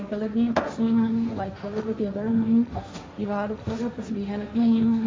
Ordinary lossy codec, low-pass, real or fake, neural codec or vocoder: none; none; fake; codec, 16 kHz, 1.1 kbps, Voila-Tokenizer